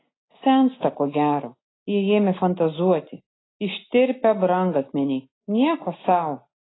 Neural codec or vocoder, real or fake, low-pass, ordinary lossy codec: none; real; 7.2 kHz; AAC, 16 kbps